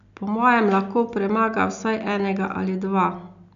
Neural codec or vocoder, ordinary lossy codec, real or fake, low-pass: none; none; real; 7.2 kHz